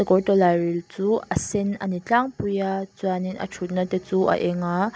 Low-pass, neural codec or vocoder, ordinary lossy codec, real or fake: none; none; none; real